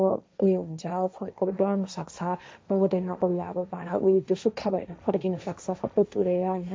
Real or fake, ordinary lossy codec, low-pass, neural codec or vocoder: fake; none; none; codec, 16 kHz, 1.1 kbps, Voila-Tokenizer